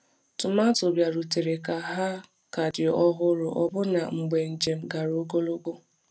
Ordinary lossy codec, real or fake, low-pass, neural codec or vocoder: none; real; none; none